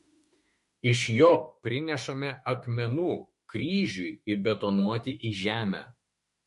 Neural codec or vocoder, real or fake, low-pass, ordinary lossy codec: autoencoder, 48 kHz, 32 numbers a frame, DAC-VAE, trained on Japanese speech; fake; 14.4 kHz; MP3, 48 kbps